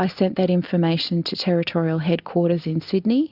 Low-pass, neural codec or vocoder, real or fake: 5.4 kHz; none; real